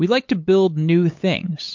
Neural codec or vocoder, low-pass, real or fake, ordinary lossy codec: codec, 16 kHz, 8 kbps, FunCodec, trained on LibriTTS, 25 frames a second; 7.2 kHz; fake; MP3, 48 kbps